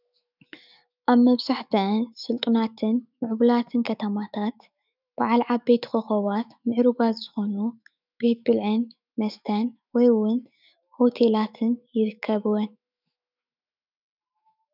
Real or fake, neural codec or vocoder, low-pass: fake; autoencoder, 48 kHz, 128 numbers a frame, DAC-VAE, trained on Japanese speech; 5.4 kHz